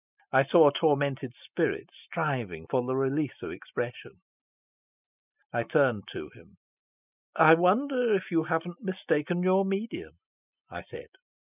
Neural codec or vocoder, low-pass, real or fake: none; 3.6 kHz; real